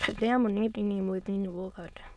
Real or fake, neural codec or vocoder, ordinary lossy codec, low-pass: fake; autoencoder, 22.05 kHz, a latent of 192 numbers a frame, VITS, trained on many speakers; none; none